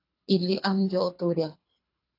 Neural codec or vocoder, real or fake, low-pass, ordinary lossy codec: codec, 24 kHz, 3 kbps, HILCodec; fake; 5.4 kHz; AAC, 32 kbps